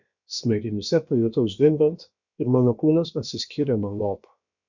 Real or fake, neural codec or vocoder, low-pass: fake; codec, 16 kHz, about 1 kbps, DyCAST, with the encoder's durations; 7.2 kHz